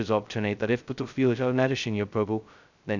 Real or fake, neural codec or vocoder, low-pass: fake; codec, 16 kHz, 0.2 kbps, FocalCodec; 7.2 kHz